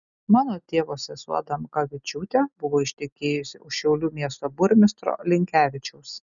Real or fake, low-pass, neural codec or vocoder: real; 7.2 kHz; none